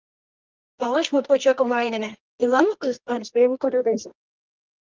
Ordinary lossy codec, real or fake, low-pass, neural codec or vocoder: Opus, 32 kbps; fake; 7.2 kHz; codec, 24 kHz, 0.9 kbps, WavTokenizer, medium music audio release